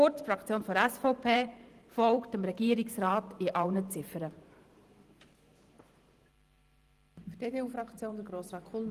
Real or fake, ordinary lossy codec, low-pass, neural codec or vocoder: fake; Opus, 24 kbps; 14.4 kHz; vocoder, 44.1 kHz, 128 mel bands every 512 samples, BigVGAN v2